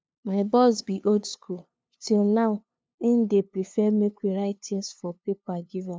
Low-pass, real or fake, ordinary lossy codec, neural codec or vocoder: none; fake; none; codec, 16 kHz, 2 kbps, FunCodec, trained on LibriTTS, 25 frames a second